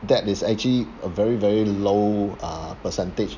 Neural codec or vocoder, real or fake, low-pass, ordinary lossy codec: none; real; 7.2 kHz; none